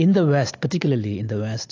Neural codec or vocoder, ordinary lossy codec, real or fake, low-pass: none; AAC, 48 kbps; real; 7.2 kHz